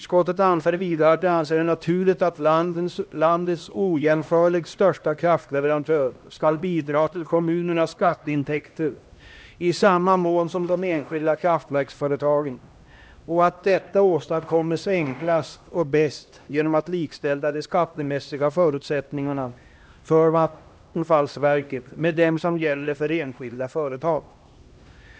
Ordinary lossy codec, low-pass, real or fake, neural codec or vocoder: none; none; fake; codec, 16 kHz, 1 kbps, X-Codec, HuBERT features, trained on LibriSpeech